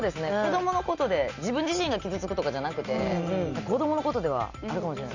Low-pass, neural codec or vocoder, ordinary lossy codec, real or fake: 7.2 kHz; none; Opus, 64 kbps; real